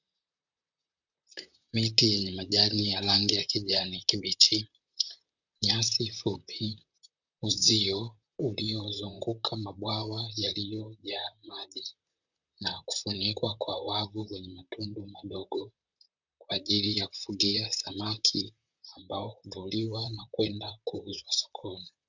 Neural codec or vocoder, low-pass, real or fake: vocoder, 44.1 kHz, 128 mel bands, Pupu-Vocoder; 7.2 kHz; fake